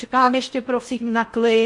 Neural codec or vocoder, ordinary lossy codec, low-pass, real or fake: codec, 16 kHz in and 24 kHz out, 0.6 kbps, FocalCodec, streaming, 2048 codes; MP3, 48 kbps; 10.8 kHz; fake